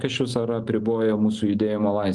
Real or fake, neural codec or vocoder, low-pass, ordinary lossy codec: real; none; 10.8 kHz; Opus, 32 kbps